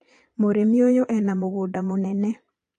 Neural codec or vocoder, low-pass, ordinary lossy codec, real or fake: vocoder, 22.05 kHz, 80 mel bands, Vocos; 9.9 kHz; AAC, 48 kbps; fake